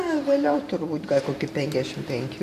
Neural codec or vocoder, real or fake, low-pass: vocoder, 44.1 kHz, 128 mel bands every 512 samples, BigVGAN v2; fake; 14.4 kHz